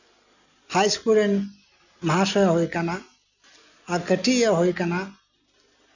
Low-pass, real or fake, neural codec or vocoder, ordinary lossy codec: 7.2 kHz; real; none; none